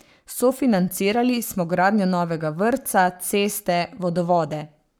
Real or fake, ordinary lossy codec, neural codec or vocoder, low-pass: fake; none; codec, 44.1 kHz, 7.8 kbps, Pupu-Codec; none